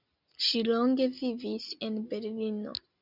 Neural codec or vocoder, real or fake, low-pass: none; real; 5.4 kHz